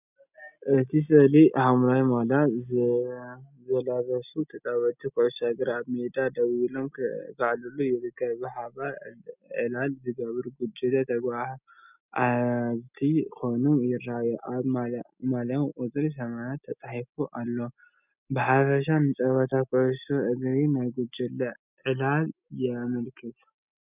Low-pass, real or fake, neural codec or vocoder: 3.6 kHz; real; none